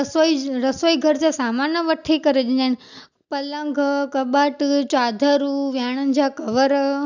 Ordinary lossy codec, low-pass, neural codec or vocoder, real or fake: none; 7.2 kHz; none; real